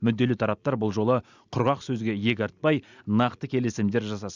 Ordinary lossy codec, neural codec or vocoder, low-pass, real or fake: none; none; 7.2 kHz; real